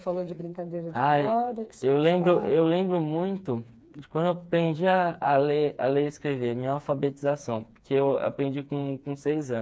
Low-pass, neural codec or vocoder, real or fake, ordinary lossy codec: none; codec, 16 kHz, 4 kbps, FreqCodec, smaller model; fake; none